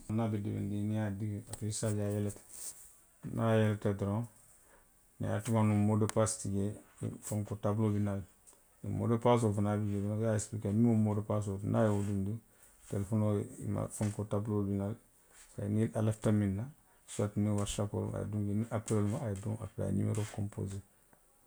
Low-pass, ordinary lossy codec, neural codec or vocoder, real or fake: none; none; none; real